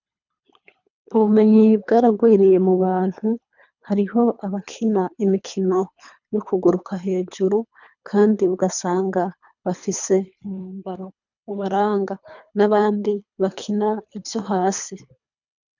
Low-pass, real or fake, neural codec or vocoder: 7.2 kHz; fake; codec, 24 kHz, 3 kbps, HILCodec